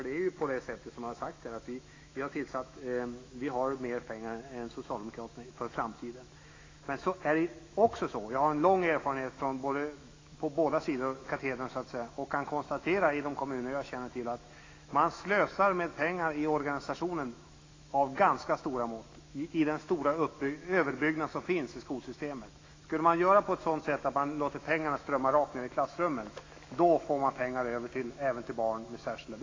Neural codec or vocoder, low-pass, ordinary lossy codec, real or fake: autoencoder, 48 kHz, 128 numbers a frame, DAC-VAE, trained on Japanese speech; 7.2 kHz; AAC, 32 kbps; fake